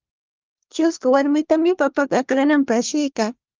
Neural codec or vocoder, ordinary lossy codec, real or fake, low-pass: codec, 24 kHz, 1 kbps, SNAC; Opus, 24 kbps; fake; 7.2 kHz